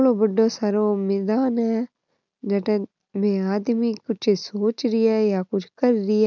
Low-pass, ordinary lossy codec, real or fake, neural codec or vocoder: 7.2 kHz; none; real; none